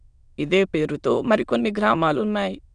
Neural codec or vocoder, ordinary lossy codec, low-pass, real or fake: autoencoder, 22.05 kHz, a latent of 192 numbers a frame, VITS, trained on many speakers; none; 9.9 kHz; fake